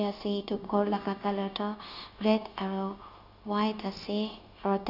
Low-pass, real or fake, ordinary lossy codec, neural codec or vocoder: 5.4 kHz; fake; none; codec, 16 kHz, 0.9 kbps, LongCat-Audio-Codec